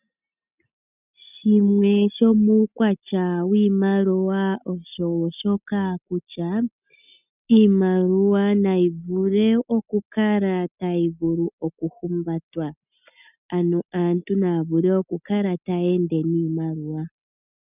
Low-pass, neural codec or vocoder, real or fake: 3.6 kHz; none; real